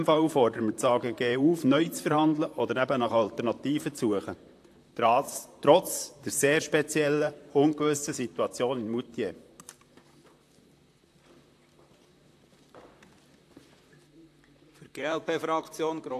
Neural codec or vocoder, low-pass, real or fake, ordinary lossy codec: vocoder, 44.1 kHz, 128 mel bands, Pupu-Vocoder; 14.4 kHz; fake; AAC, 64 kbps